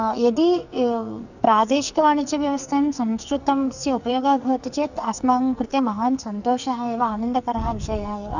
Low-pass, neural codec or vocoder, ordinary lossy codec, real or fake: 7.2 kHz; codec, 44.1 kHz, 2.6 kbps, SNAC; none; fake